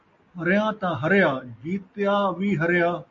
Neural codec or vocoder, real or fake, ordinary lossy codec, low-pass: none; real; MP3, 48 kbps; 7.2 kHz